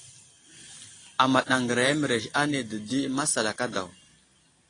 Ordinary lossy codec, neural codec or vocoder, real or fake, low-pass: AAC, 32 kbps; none; real; 9.9 kHz